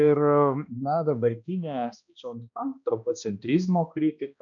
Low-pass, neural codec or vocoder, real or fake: 7.2 kHz; codec, 16 kHz, 1 kbps, X-Codec, HuBERT features, trained on balanced general audio; fake